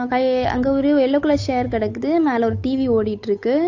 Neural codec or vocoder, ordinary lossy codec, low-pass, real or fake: codec, 16 kHz, 8 kbps, FunCodec, trained on Chinese and English, 25 frames a second; AAC, 48 kbps; 7.2 kHz; fake